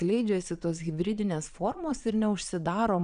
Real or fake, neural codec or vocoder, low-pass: fake; vocoder, 22.05 kHz, 80 mel bands, Vocos; 9.9 kHz